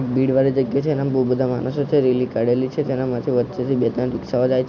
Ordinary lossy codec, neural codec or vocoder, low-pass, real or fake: none; none; 7.2 kHz; real